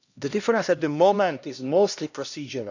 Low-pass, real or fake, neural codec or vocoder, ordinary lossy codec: 7.2 kHz; fake; codec, 16 kHz, 1 kbps, X-Codec, HuBERT features, trained on LibriSpeech; none